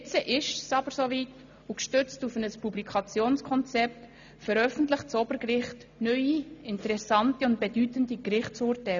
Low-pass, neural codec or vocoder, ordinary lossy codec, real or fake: 7.2 kHz; none; none; real